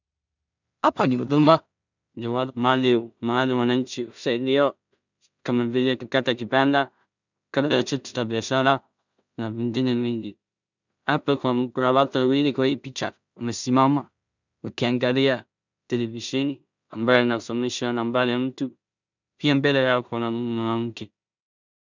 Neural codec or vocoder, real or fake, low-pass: codec, 16 kHz in and 24 kHz out, 0.4 kbps, LongCat-Audio-Codec, two codebook decoder; fake; 7.2 kHz